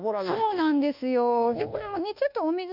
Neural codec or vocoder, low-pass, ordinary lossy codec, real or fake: codec, 24 kHz, 1.2 kbps, DualCodec; 5.4 kHz; none; fake